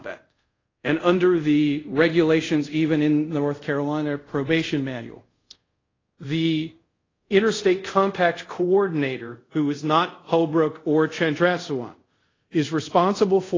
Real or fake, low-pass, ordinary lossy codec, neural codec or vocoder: fake; 7.2 kHz; AAC, 32 kbps; codec, 24 kHz, 0.5 kbps, DualCodec